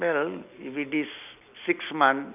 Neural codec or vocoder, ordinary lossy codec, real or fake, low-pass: none; none; real; 3.6 kHz